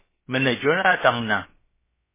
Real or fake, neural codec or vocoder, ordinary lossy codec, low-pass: fake; codec, 16 kHz, about 1 kbps, DyCAST, with the encoder's durations; MP3, 16 kbps; 3.6 kHz